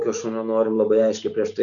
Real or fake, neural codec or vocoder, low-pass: real; none; 7.2 kHz